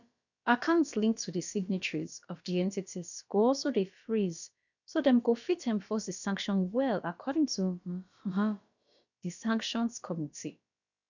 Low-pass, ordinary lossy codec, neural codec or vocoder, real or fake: 7.2 kHz; none; codec, 16 kHz, about 1 kbps, DyCAST, with the encoder's durations; fake